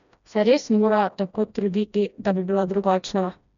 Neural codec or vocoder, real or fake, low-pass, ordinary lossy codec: codec, 16 kHz, 1 kbps, FreqCodec, smaller model; fake; 7.2 kHz; none